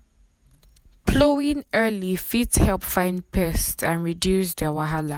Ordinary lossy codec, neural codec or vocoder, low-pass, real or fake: none; vocoder, 48 kHz, 128 mel bands, Vocos; none; fake